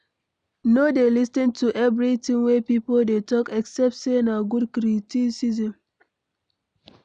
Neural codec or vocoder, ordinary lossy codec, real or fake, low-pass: none; none; real; 9.9 kHz